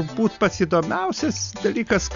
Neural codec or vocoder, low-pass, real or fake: none; 7.2 kHz; real